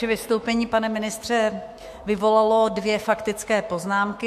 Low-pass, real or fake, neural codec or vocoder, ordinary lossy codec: 14.4 kHz; fake; autoencoder, 48 kHz, 128 numbers a frame, DAC-VAE, trained on Japanese speech; MP3, 64 kbps